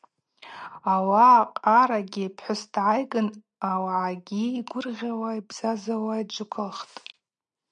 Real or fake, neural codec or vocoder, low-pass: real; none; 9.9 kHz